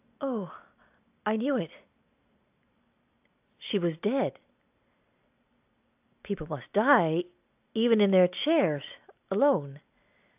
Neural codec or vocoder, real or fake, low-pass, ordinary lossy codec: none; real; 3.6 kHz; AAC, 32 kbps